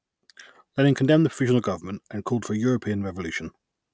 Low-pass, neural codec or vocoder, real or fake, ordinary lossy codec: none; none; real; none